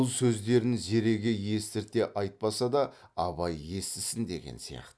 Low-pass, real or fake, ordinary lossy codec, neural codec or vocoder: none; real; none; none